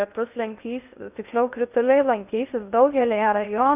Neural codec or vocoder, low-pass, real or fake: codec, 16 kHz in and 24 kHz out, 0.6 kbps, FocalCodec, streaming, 2048 codes; 3.6 kHz; fake